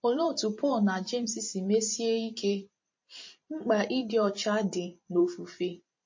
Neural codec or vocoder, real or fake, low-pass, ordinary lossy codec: vocoder, 44.1 kHz, 128 mel bands every 512 samples, BigVGAN v2; fake; 7.2 kHz; MP3, 32 kbps